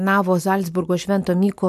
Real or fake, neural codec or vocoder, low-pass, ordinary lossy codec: real; none; 14.4 kHz; MP3, 96 kbps